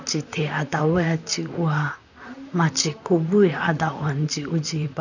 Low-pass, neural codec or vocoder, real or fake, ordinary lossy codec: 7.2 kHz; vocoder, 44.1 kHz, 128 mel bands, Pupu-Vocoder; fake; none